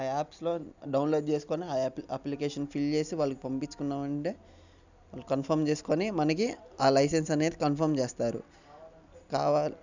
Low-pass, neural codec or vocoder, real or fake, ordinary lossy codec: 7.2 kHz; none; real; none